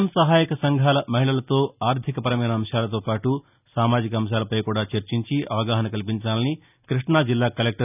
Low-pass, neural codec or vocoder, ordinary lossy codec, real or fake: 3.6 kHz; none; none; real